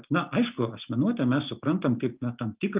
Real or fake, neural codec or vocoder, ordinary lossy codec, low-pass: real; none; Opus, 24 kbps; 3.6 kHz